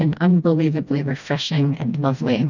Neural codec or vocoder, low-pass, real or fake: codec, 16 kHz, 1 kbps, FreqCodec, smaller model; 7.2 kHz; fake